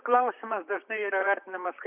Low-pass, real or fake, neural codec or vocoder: 3.6 kHz; fake; vocoder, 44.1 kHz, 128 mel bands, Pupu-Vocoder